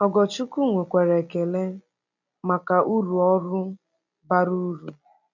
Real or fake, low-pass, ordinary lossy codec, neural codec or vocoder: real; 7.2 kHz; none; none